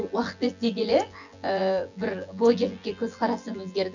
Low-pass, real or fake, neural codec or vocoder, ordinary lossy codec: 7.2 kHz; fake; vocoder, 24 kHz, 100 mel bands, Vocos; none